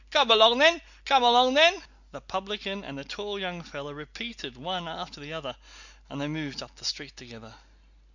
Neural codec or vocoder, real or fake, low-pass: none; real; 7.2 kHz